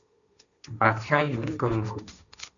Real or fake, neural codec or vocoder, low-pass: fake; codec, 16 kHz, 1.1 kbps, Voila-Tokenizer; 7.2 kHz